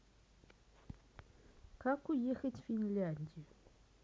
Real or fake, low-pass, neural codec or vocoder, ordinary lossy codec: real; none; none; none